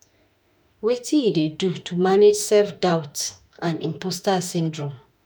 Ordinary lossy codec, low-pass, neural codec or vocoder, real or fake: none; none; autoencoder, 48 kHz, 32 numbers a frame, DAC-VAE, trained on Japanese speech; fake